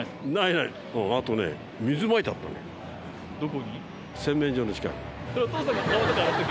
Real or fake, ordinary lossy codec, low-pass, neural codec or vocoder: real; none; none; none